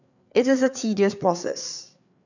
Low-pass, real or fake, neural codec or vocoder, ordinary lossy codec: 7.2 kHz; fake; codec, 16 kHz, 4 kbps, FreqCodec, larger model; none